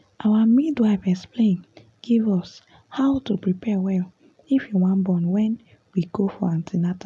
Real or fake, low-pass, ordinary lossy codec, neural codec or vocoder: real; none; none; none